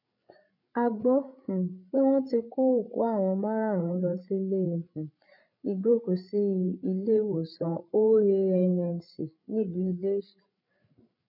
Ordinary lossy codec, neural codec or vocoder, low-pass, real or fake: none; codec, 16 kHz, 16 kbps, FreqCodec, larger model; 5.4 kHz; fake